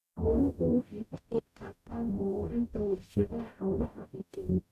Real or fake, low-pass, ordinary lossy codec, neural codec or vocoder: fake; 14.4 kHz; none; codec, 44.1 kHz, 0.9 kbps, DAC